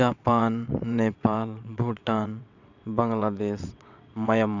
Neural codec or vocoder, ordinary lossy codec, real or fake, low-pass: vocoder, 22.05 kHz, 80 mel bands, WaveNeXt; none; fake; 7.2 kHz